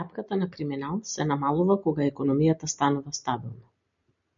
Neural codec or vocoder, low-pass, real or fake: none; 7.2 kHz; real